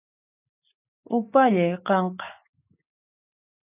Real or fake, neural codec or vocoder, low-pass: real; none; 3.6 kHz